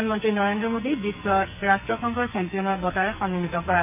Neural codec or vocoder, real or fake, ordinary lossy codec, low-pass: codec, 44.1 kHz, 2.6 kbps, SNAC; fake; none; 3.6 kHz